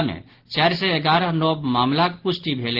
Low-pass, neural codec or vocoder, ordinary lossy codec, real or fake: 5.4 kHz; none; Opus, 16 kbps; real